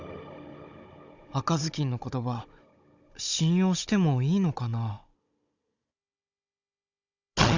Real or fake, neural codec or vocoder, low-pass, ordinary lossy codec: fake; codec, 16 kHz, 16 kbps, FunCodec, trained on Chinese and English, 50 frames a second; 7.2 kHz; Opus, 64 kbps